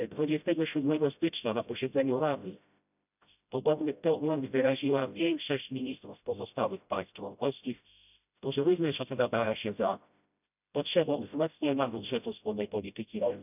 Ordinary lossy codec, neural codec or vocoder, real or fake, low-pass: none; codec, 16 kHz, 0.5 kbps, FreqCodec, smaller model; fake; 3.6 kHz